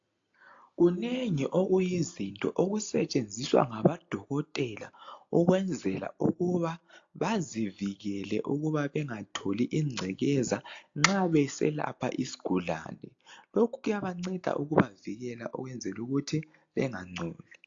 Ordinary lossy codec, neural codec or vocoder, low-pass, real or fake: AAC, 48 kbps; none; 7.2 kHz; real